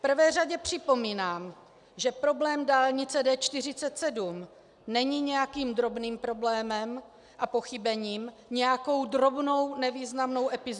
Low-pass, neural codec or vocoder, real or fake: 10.8 kHz; none; real